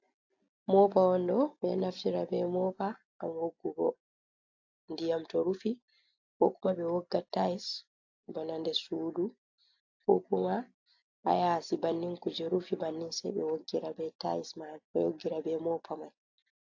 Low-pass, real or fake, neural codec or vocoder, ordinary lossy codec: 7.2 kHz; real; none; AAC, 48 kbps